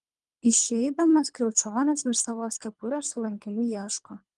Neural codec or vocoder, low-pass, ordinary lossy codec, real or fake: codec, 24 kHz, 3 kbps, HILCodec; 10.8 kHz; Opus, 32 kbps; fake